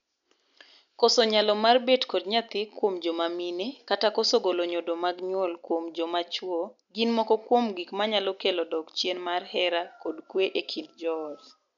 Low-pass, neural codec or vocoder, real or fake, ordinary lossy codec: 7.2 kHz; none; real; none